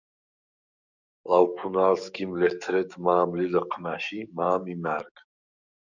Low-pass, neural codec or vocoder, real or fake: 7.2 kHz; codec, 44.1 kHz, 7.8 kbps, DAC; fake